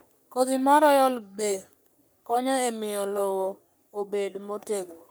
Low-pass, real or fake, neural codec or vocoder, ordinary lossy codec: none; fake; codec, 44.1 kHz, 3.4 kbps, Pupu-Codec; none